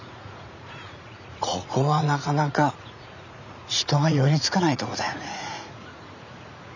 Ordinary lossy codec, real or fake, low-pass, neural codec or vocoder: none; fake; 7.2 kHz; vocoder, 44.1 kHz, 80 mel bands, Vocos